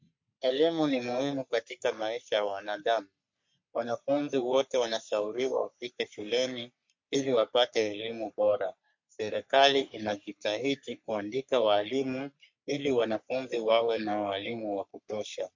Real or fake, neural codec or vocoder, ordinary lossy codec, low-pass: fake; codec, 44.1 kHz, 3.4 kbps, Pupu-Codec; MP3, 48 kbps; 7.2 kHz